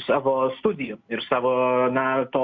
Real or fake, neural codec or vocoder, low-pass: real; none; 7.2 kHz